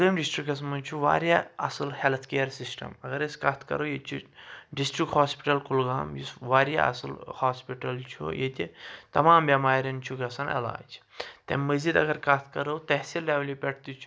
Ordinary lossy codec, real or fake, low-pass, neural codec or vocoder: none; real; none; none